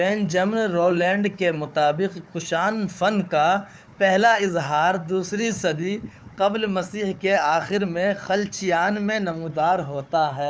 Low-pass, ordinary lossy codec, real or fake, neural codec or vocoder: none; none; fake; codec, 16 kHz, 4 kbps, FunCodec, trained on Chinese and English, 50 frames a second